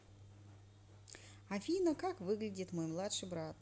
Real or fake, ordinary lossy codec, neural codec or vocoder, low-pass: real; none; none; none